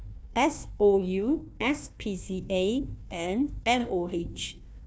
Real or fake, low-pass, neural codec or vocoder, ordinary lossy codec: fake; none; codec, 16 kHz, 1 kbps, FunCodec, trained on Chinese and English, 50 frames a second; none